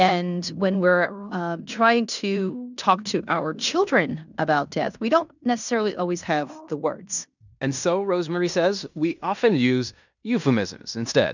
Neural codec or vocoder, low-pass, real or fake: codec, 16 kHz in and 24 kHz out, 0.9 kbps, LongCat-Audio-Codec, fine tuned four codebook decoder; 7.2 kHz; fake